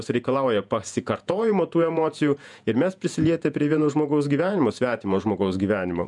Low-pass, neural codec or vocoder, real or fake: 10.8 kHz; vocoder, 48 kHz, 128 mel bands, Vocos; fake